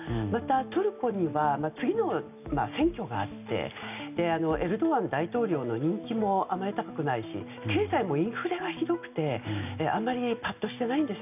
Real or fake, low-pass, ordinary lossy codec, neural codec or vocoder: real; 3.6 kHz; none; none